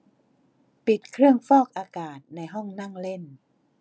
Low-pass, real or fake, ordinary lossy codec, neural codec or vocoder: none; real; none; none